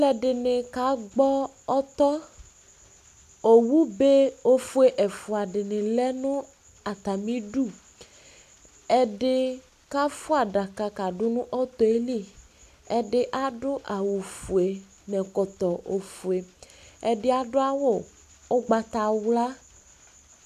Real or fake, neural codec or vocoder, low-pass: real; none; 14.4 kHz